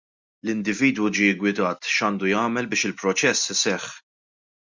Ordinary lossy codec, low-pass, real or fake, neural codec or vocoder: MP3, 64 kbps; 7.2 kHz; real; none